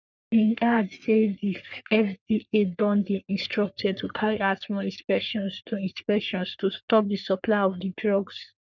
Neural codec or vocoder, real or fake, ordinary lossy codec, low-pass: codec, 44.1 kHz, 3.4 kbps, Pupu-Codec; fake; none; 7.2 kHz